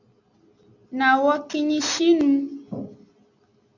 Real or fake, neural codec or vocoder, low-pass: real; none; 7.2 kHz